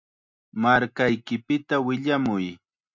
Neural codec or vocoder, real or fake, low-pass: none; real; 7.2 kHz